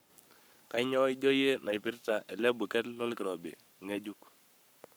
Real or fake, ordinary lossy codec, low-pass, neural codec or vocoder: fake; none; none; codec, 44.1 kHz, 7.8 kbps, Pupu-Codec